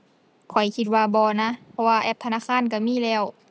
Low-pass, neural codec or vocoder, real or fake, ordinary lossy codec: none; none; real; none